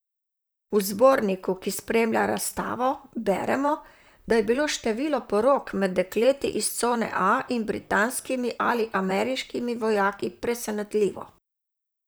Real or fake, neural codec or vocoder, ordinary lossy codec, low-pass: fake; vocoder, 44.1 kHz, 128 mel bands, Pupu-Vocoder; none; none